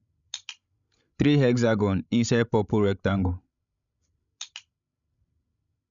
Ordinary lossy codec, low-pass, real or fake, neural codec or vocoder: none; 7.2 kHz; fake; codec, 16 kHz, 16 kbps, FreqCodec, larger model